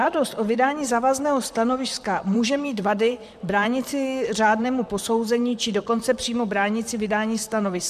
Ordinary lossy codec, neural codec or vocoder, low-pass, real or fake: MP3, 96 kbps; vocoder, 44.1 kHz, 128 mel bands, Pupu-Vocoder; 14.4 kHz; fake